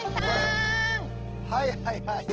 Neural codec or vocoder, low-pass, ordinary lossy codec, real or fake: none; 7.2 kHz; Opus, 16 kbps; real